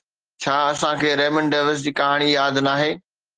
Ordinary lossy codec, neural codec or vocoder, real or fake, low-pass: Opus, 16 kbps; none; real; 9.9 kHz